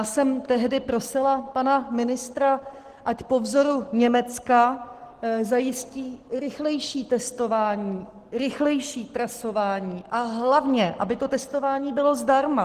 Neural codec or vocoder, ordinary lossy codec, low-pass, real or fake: none; Opus, 16 kbps; 14.4 kHz; real